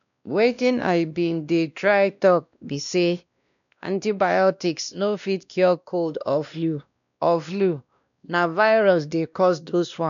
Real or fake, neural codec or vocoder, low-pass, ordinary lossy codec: fake; codec, 16 kHz, 1 kbps, X-Codec, WavLM features, trained on Multilingual LibriSpeech; 7.2 kHz; none